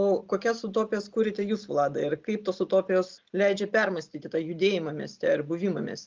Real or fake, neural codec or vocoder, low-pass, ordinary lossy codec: real; none; 7.2 kHz; Opus, 32 kbps